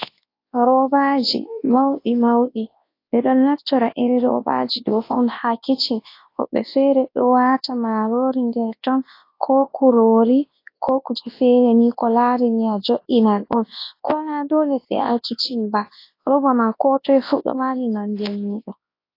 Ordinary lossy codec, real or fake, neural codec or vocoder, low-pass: AAC, 32 kbps; fake; codec, 24 kHz, 0.9 kbps, WavTokenizer, large speech release; 5.4 kHz